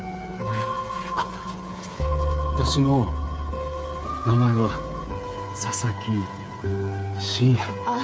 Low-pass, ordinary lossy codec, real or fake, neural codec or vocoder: none; none; fake; codec, 16 kHz, 8 kbps, FreqCodec, smaller model